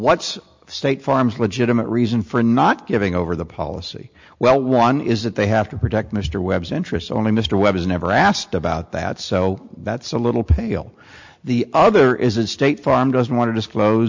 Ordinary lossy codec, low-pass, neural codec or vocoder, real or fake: MP3, 64 kbps; 7.2 kHz; none; real